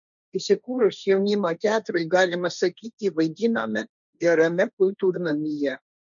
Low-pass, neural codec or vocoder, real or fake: 7.2 kHz; codec, 16 kHz, 1.1 kbps, Voila-Tokenizer; fake